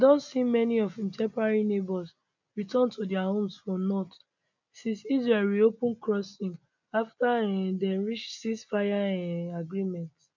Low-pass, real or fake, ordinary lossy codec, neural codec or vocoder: 7.2 kHz; real; none; none